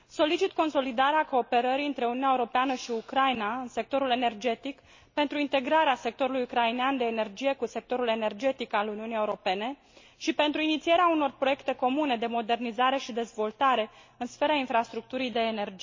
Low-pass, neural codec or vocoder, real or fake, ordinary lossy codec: 7.2 kHz; none; real; MP3, 32 kbps